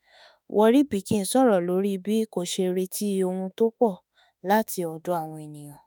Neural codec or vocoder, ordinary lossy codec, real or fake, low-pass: autoencoder, 48 kHz, 32 numbers a frame, DAC-VAE, trained on Japanese speech; none; fake; none